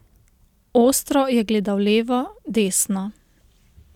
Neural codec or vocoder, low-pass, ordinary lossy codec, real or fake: none; 19.8 kHz; none; real